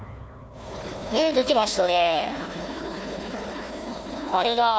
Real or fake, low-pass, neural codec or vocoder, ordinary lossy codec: fake; none; codec, 16 kHz, 1 kbps, FunCodec, trained on Chinese and English, 50 frames a second; none